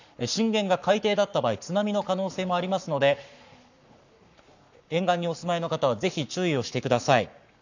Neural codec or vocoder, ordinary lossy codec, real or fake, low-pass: codec, 44.1 kHz, 7.8 kbps, Pupu-Codec; none; fake; 7.2 kHz